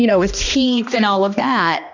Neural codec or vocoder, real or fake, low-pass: codec, 16 kHz, 1 kbps, X-Codec, HuBERT features, trained on balanced general audio; fake; 7.2 kHz